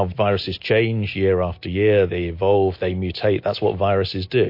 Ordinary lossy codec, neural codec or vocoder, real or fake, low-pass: MP3, 32 kbps; none; real; 5.4 kHz